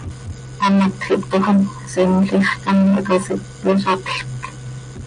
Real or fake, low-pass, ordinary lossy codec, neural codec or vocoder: real; 9.9 kHz; MP3, 96 kbps; none